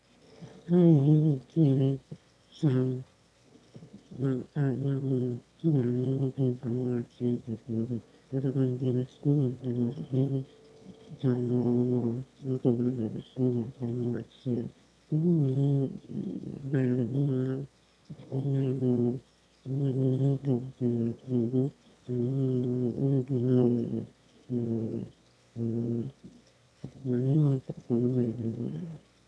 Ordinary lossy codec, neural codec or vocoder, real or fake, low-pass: none; autoencoder, 22.05 kHz, a latent of 192 numbers a frame, VITS, trained on one speaker; fake; none